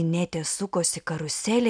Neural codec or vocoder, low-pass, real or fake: none; 9.9 kHz; real